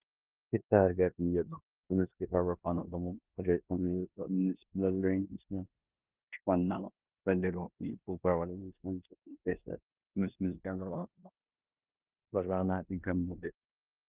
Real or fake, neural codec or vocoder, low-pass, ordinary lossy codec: fake; codec, 16 kHz in and 24 kHz out, 0.9 kbps, LongCat-Audio-Codec, four codebook decoder; 3.6 kHz; Opus, 32 kbps